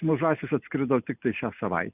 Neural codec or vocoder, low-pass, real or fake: none; 3.6 kHz; real